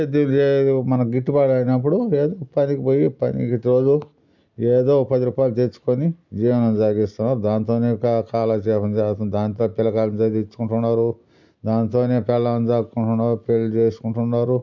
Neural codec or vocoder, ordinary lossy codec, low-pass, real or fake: none; none; 7.2 kHz; real